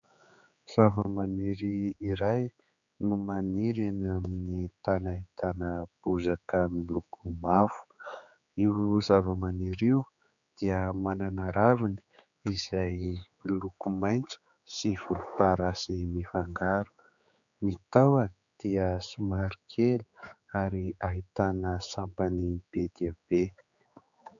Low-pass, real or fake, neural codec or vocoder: 7.2 kHz; fake; codec, 16 kHz, 4 kbps, X-Codec, HuBERT features, trained on general audio